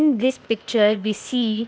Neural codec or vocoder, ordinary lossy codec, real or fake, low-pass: codec, 16 kHz, 0.8 kbps, ZipCodec; none; fake; none